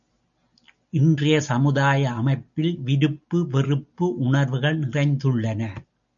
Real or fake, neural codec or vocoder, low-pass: real; none; 7.2 kHz